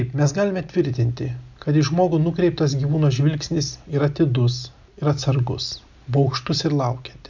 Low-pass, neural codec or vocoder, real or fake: 7.2 kHz; none; real